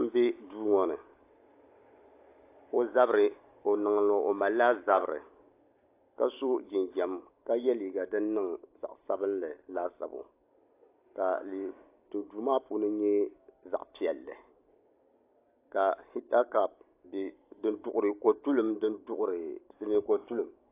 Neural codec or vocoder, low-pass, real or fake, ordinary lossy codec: none; 3.6 kHz; real; AAC, 24 kbps